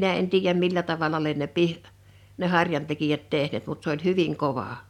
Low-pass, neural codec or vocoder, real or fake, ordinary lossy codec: 19.8 kHz; none; real; none